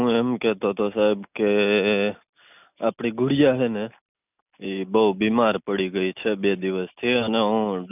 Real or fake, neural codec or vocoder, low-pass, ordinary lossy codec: real; none; 3.6 kHz; none